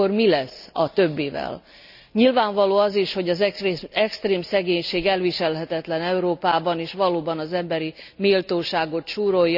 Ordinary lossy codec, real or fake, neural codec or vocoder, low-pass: none; real; none; 5.4 kHz